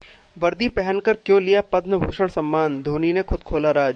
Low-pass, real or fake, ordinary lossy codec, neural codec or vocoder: 9.9 kHz; fake; MP3, 64 kbps; codec, 44.1 kHz, 7.8 kbps, DAC